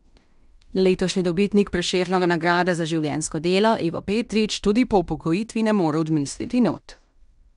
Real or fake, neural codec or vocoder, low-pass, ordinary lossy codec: fake; codec, 16 kHz in and 24 kHz out, 0.9 kbps, LongCat-Audio-Codec, fine tuned four codebook decoder; 10.8 kHz; none